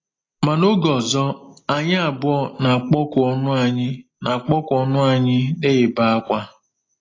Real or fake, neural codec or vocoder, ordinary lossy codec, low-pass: real; none; AAC, 32 kbps; 7.2 kHz